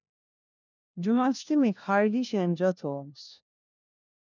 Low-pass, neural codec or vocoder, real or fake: 7.2 kHz; codec, 16 kHz, 1 kbps, FunCodec, trained on LibriTTS, 50 frames a second; fake